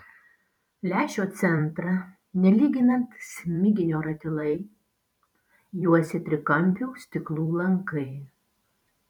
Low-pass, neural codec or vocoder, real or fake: 19.8 kHz; vocoder, 44.1 kHz, 128 mel bands every 512 samples, BigVGAN v2; fake